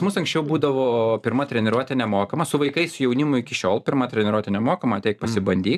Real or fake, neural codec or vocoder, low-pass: fake; vocoder, 44.1 kHz, 128 mel bands every 512 samples, BigVGAN v2; 14.4 kHz